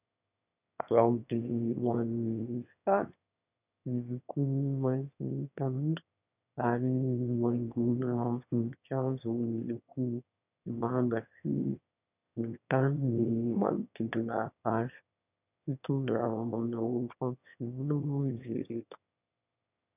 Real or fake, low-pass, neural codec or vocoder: fake; 3.6 kHz; autoencoder, 22.05 kHz, a latent of 192 numbers a frame, VITS, trained on one speaker